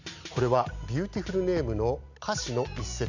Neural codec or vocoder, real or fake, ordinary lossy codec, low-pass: none; real; none; 7.2 kHz